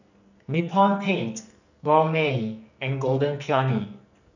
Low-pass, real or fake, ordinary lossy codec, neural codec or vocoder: 7.2 kHz; fake; none; codec, 44.1 kHz, 2.6 kbps, SNAC